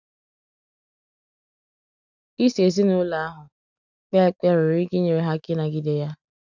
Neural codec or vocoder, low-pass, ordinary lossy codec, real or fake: none; 7.2 kHz; none; real